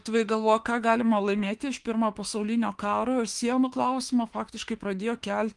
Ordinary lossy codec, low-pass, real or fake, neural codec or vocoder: Opus, 24 kbps; 10.8 kHz; fake; autoencoder, 48 kHz, 32 numbers a frame, DAC-VAE, trained on Japanese speech